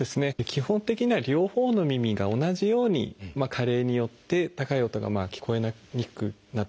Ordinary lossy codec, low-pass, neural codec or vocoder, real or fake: none; none; none; real